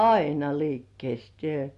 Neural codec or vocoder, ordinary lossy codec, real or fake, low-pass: none; Opus, 64 kbps; real; 10.8 kHz